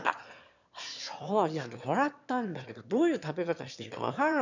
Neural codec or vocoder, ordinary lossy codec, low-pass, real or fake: autoencoder, 22.05 kHz, a latent of 192 numbers a frame, VITS, trained on one speaker; none; 7.2 kHz; fake